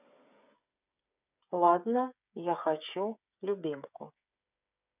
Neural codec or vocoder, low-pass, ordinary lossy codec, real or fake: codec, 16 kHz, 8 kbps, FreqCodec, smaller model; 3.6 kHz; none; fake